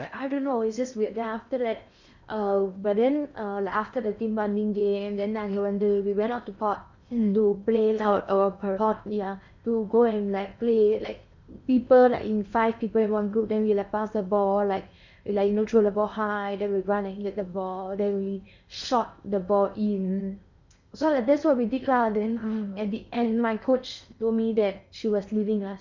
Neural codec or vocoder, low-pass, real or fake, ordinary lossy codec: codec, 16 kHz in and 24 kHz out, 0.8 kbps, FocalCodec, streaming, 65536 codes; 7.2 kHz; fake; none